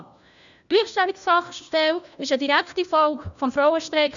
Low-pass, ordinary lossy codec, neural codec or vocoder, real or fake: 7.2 kHz; none; codec, 16 kHz, 1 kbps, FunCodec, trained on LibriTTS, 50 frames a second; fake